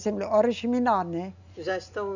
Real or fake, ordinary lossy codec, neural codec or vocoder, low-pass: real; none; none; 7.2 kHz